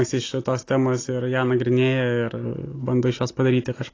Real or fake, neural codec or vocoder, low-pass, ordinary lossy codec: real; none; 7.2 kHz; AAC, 32 kbps